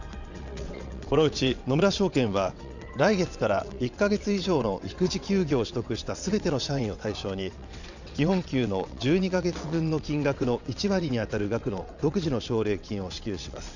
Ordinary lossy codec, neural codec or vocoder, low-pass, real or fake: none; vocoder, 22.05 kHz, 80 mel bands, WaveNeXt; 7.2 kHz; fake